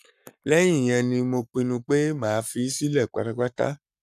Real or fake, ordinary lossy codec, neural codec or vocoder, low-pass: fake; none; codec, 44.1 kHz, 7.8 kbps, Pupu-Codec; 14.4 kHz